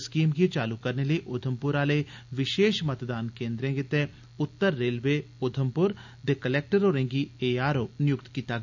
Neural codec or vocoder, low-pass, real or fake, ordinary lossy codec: none; 7.2 kHz; real; none